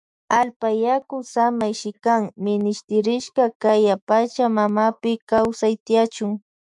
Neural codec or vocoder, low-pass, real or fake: autoencoder, 48 kHz, 128 numbers a frame, DAC-VAE, trained on Japanese speech; 10.8 kHz; fake